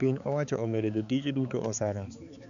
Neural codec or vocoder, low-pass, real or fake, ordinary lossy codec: codec, 16 kHz, 4 kbps, X-Codec, HuBERT features, trained on balanced general audio; 7.2 kHz; fake; none